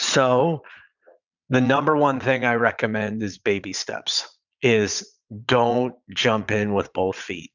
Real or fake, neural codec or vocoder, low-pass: fake; vocoder, 22.05 kHz, 80 mel bands, Vocos; 7.2 kHz